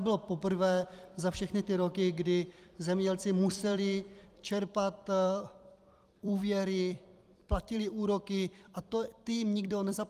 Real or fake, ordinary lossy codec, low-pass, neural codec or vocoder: fake; Opus, 32 kbps; 14.4 kHz; vocoder, 44.1 kHz, 128 mel bands every 256 samples, BigVGAN v2